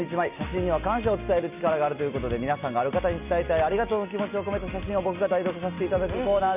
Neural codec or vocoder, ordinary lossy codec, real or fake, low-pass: none; none; real; 3.6 kHz